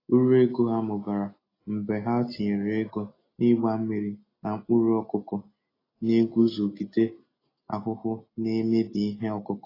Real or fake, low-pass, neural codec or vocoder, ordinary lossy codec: real; 5.4 kHz; none; AAC, 24 kbps